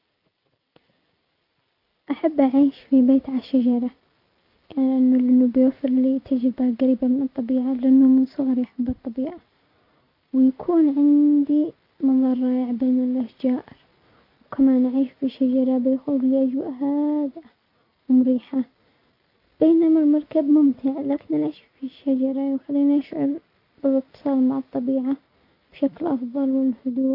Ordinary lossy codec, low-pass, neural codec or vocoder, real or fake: AAC, 48 kbps; 5.4 kHz; none; real